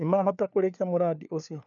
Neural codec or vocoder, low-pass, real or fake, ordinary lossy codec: codec, 16 kHz, 4 kbps, FunCodec, trained on LibriTTS, 50 frames a second; 7.2 kHz; fake; none